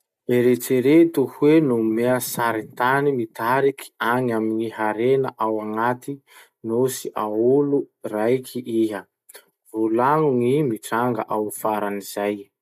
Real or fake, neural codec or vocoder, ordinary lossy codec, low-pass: real; none; none; 14.4 kHz